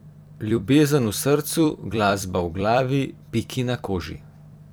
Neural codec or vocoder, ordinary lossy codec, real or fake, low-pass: vocoder, 44.1 kHz, 128 mel bands every 256 samples, BigVGAN v2; none; fake; none